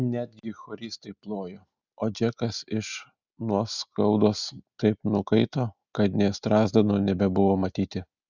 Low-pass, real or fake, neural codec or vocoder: 7.2 kHz; real; none